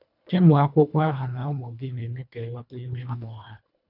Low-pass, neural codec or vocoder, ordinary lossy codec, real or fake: 5.4 kHz; codec, 24 kHz, 1.5 kbps, HILCodec; none; fake